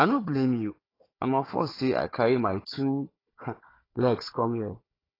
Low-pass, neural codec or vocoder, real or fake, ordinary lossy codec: 5.4 kHz; codec, 16 kHz, 4 kbps, FunCodec, trained on Chinese and English, 50 frames a second; fake; AAC, 24 kbps